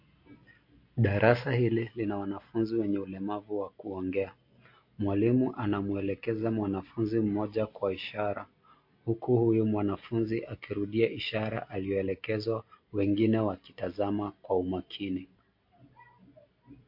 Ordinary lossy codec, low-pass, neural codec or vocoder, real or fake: MP3, 32 kbps; 5.4 kHz; none; real